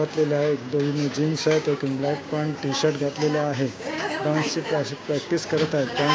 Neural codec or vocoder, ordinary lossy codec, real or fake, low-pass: codec, 16 kHz, 6 kbps, DAC; none; fake; none